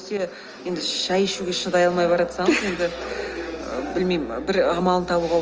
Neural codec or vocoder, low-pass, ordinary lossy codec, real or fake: none; 7.2 kHz; Opus, 24 kbps; real